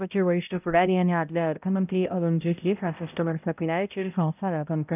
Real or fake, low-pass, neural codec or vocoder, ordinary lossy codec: fake; 3.6 kHz; codec, 16 kHz, 0.5 kbps, X-Codec, HuBERT features, trained on balanced general audio; none